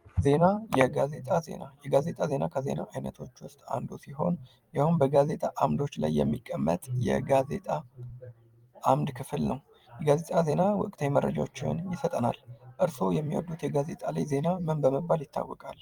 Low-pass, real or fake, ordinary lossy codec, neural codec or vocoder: 19.8 kHz; fake; Opus, 32 kbps; vocoder, 44.1 kHz, 128 mel bands every 256 samples, BigVGAN v2